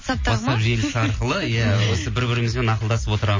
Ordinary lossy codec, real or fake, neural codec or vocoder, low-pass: MP3, 32 kbps; real; none; 7.2 kHz